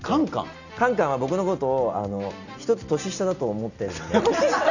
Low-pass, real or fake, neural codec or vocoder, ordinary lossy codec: 7.2 kHz; real; none; none